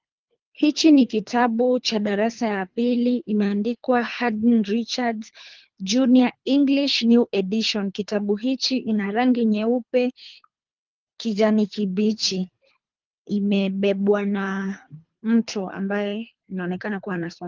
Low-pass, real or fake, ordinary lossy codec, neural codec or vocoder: 7.2 kHz; fake; Opus, 32 kbps; codec, 24 kHz, 3 kbps, HILCodec